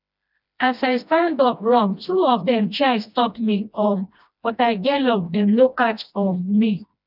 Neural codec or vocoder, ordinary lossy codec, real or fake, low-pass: codec, 16 kHz, 1 kbps, FreqCodec, smaller model; none; fake; 5.4 kHz